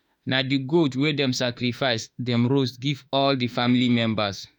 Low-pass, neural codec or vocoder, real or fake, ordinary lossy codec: 19.8 kHz; autoencoder, 48 kHz, 32 numbers a frame, DAC-VAE, trained on Japanese speech; fake; none